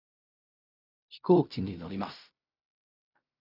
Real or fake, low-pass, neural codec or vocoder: fake; 5.4 kHz; codec, 16 kHz in and 24 kHz out, 0.4 kbps, LongCat-Audio-Codec, fine tuned four codebook decoder